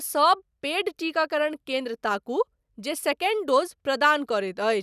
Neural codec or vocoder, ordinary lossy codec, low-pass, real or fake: none; none; 14.4 kHz; real